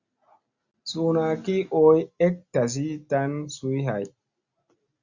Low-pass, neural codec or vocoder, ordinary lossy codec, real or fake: 7.2 kHz; none; Opus, 64 kbps; real